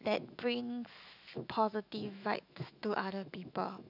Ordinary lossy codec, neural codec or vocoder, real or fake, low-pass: none; autoencoder, 48 kHz, 128 numbers a frame, DAC-VAE, trained on Japanese speech; fake; 5.4 kHz